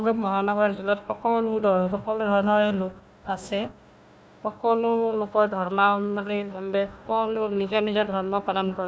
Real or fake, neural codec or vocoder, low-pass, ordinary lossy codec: fake; codec, 16 kHz, 1 kbps, FunCodec, trained on Chinese and English, 50 frames a second; none; none